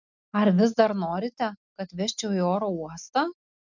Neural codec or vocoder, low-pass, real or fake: none; 7.2 kHz; real